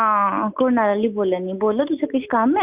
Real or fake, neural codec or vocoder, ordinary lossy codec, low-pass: real; none; Opus, 64 kbps; 3.6 kHz